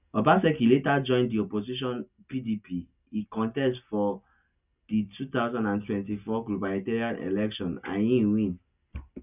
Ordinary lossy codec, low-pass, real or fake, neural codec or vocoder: none; 3.6 kHz; real; none